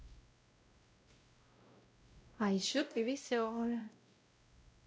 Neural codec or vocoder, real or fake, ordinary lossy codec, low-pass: codec, 16 kHz, 0.5 kbps, X-Codec, WavLM features, trained on Multilingual LibriSpeech; fake; none; none